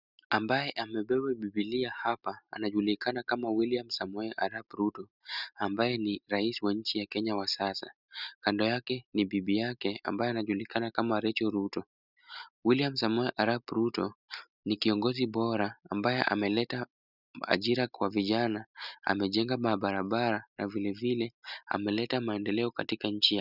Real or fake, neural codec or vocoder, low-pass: real; none; 5.4 kHz